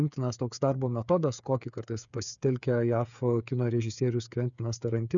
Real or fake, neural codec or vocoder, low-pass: fake; codec, 16 kHz, 8 kbps, FreqCodec, smaller model; 7.2 kHz